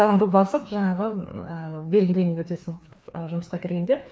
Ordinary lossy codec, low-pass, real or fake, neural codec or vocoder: none; none; fake; codec, 16 kHz, 2 kbps, FreqCodec, larger model